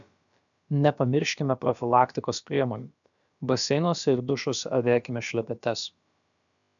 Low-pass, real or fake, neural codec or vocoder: 7.2 kHz; fake; codec, 16 kHz, about 1 kbps, DyCAST, with the encoder's durations